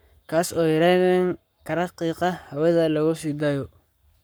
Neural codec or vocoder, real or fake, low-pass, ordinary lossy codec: codec, 44.1 kHz, 7.8 kbps, Pupu-Codec; fake; none; none